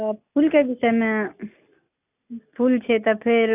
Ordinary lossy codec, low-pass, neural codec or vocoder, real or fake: AAC, 32 kbps; 3.6 kHz; none; real